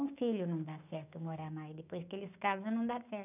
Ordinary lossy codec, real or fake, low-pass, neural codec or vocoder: none; fake; 3.6 kHz; codec, 16 kHz, 6 kbps, DAC